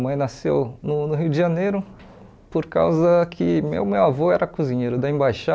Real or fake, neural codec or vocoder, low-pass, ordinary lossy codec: real; none; none; none